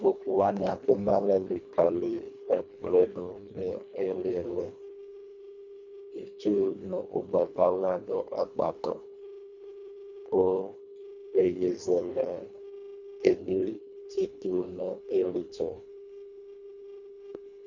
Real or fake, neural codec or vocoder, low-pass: fake; codec, 24 kHz, 1.5 kbps, HILCodec; 7.2 kHz